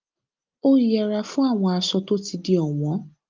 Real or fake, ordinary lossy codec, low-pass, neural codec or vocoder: real; Opus, 24 kbps; 7.2 kHz; none